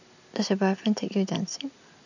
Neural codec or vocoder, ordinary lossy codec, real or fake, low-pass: none; none; real; 7.2 kHz